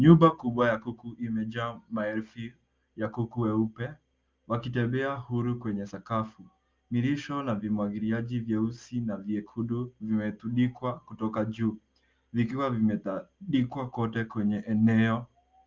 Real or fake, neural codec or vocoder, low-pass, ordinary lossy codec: real; none; 7.2 kHz; Opus, 24 kbps